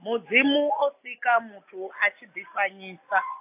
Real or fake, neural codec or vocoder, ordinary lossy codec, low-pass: real; none; AAC, 32 kbps; 3.6 kHz